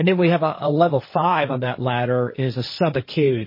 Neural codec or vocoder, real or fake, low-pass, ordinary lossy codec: codec, 16 kHz, 1.1 kbps, Voila-Tokenizer; fake; 5.4 kHz; MP3, 24 kbps